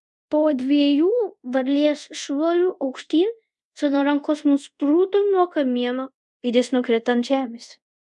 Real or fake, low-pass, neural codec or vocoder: fake; 10.8 kHz; codec, 24 kHz, 0.5 kbps, DualCodec